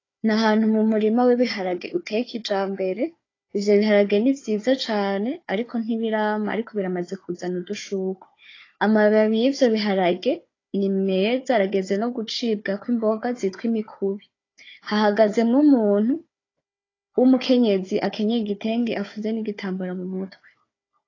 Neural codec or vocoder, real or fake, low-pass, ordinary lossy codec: codec, 16 kHz, 4 kbps, FunCodec, trained on Chinese and English, 50 frames a second; fake; 7.2 kHz; AAC, 32 kbps